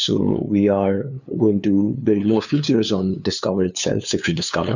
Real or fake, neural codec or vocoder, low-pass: fake; codec, 16 kHz, 2 kbps, FunCodec, trained on LibriTTS, 25 frames a second; 7.2 kHz